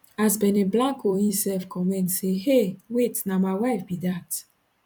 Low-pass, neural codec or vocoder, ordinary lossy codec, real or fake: 19.8 kHz; vocoder, 44.1 kHz, 128 mel bands every 256 samples, BigVGAN v2; none; fake